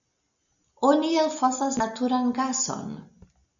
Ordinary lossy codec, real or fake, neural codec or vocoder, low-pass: MP3, 64 kbps; real; none; 7.2 kHz